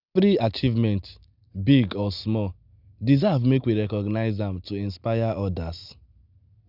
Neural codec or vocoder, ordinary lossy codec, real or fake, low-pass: none; none; real; 5.4 kHz